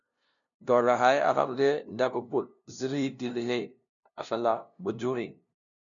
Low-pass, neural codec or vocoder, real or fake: 7.2 kHz; codec, 16 kHz, 0.5 kbps, FunCodec, trained on LibriTTS, 25 frames a second; fake